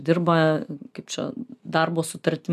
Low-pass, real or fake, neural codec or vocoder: 14.4 kHz; real; none